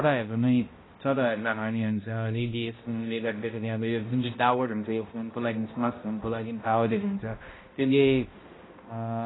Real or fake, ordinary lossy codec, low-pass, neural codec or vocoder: fake; AAC, 16 kbps; 7.2 kHz; codec, 16 kHz, 0.5 kbps, X-Codec, HuBERT features, trained on balanced general audio